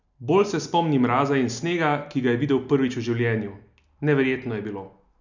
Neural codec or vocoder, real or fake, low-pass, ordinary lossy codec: none; real; 7.2 kHz; none